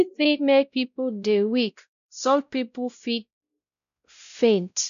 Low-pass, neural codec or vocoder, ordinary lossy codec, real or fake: 7.2 kHz; codec, 16 kHz, 0.5 kbps, X-Codec, WavLM features, trained on Multilingual LibriSpeech; none; fake